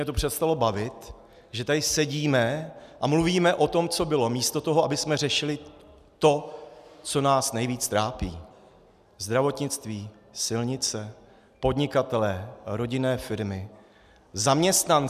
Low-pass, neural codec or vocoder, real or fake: 14.4 kHz; none; real